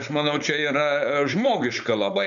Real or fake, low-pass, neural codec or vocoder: fake; 7.2 kHz; codec, 16 kHz, 4.8 kbps, FACodec